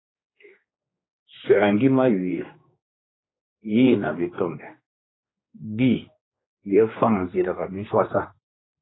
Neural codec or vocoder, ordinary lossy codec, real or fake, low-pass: codec, 16 kHz, 2 kbps, X-Codec, HuBERT features, trained on general audio; AAC, 16 kbps; fake; 7.2 kHz